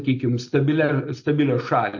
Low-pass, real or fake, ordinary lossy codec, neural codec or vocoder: 7.2 kHz; real; MP3, 48 kbps; none